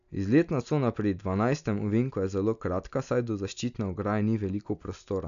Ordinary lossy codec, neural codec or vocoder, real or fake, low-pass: none; none; real; 7.2 kHz